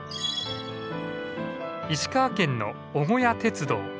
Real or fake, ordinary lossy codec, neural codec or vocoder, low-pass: real; none; none; none